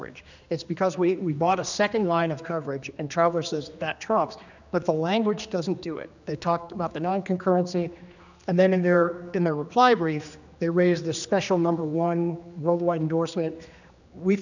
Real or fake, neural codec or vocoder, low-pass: fake; codec, 16 kHz, 2 kbps, X-Codec, HuBERT features, trained on general audio; 7.2 kHz